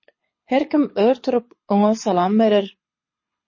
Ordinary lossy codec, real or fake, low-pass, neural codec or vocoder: MP3, 32 kbps; fake; 7.2 kHz; codec, 24 kHz, 6 kbps, HILCodec